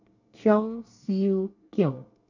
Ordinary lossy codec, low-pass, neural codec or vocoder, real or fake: none; 7.2 kHz; codec, 24 kHz, 1 kbps, SNAC; fake